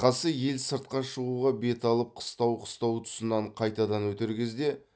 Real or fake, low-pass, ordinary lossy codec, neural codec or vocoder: real; none; none; none